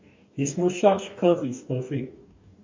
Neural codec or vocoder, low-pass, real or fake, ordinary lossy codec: codec, 44.1 kHz, 2.6 kbps, DAC; 7.2 kHz; fake; MP3, 48 kbps